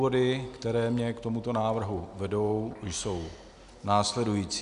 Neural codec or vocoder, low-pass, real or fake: none; 10.8 kHz; real